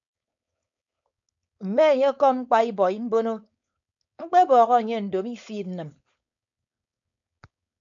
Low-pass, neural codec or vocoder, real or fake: 7.2 kHz; codec, 16 kHz, 4.8 kbps, FACodec; fake